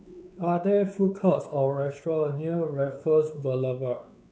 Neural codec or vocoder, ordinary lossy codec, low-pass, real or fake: codec, 16 kHz, 4 kbps, X-Codec, WavLM features, trained on Multilingual LibriSpeech; none; none; fake